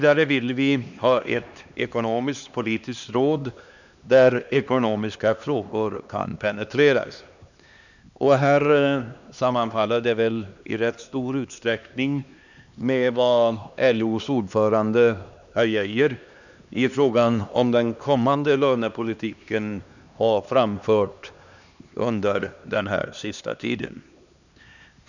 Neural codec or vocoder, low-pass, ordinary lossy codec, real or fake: codec, 16 kHz, 2 kbps, X-Codec, HuBERT features, trained on LibriSpeech; 7.2 kHz; none; fake